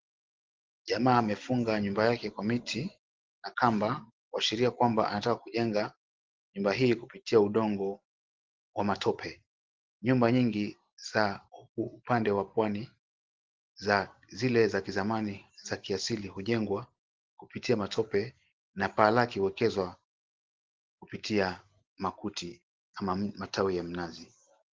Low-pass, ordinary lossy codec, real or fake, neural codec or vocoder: 7.2 kHz; Opus, 16 kbps; real; none